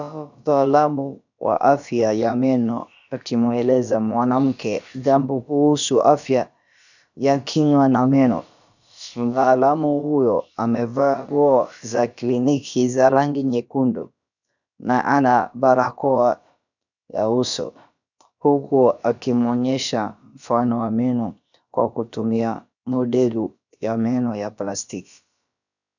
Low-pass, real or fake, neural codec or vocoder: 7.2 kHz; fake; codec, 16 kHz, about 1 kbps, DyCAST, with the encoder's durations